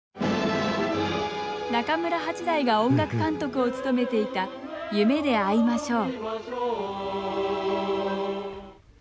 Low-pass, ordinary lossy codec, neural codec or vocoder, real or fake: none; none; none; real